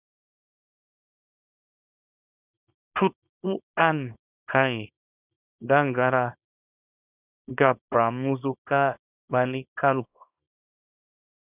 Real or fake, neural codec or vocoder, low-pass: fake; codec, 24 kHz, 0.9 kbps, WavTokenizer, small release; 3.6 kHz